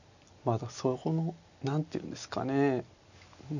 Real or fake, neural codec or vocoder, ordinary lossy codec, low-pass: real; none; none; 7.2 kHz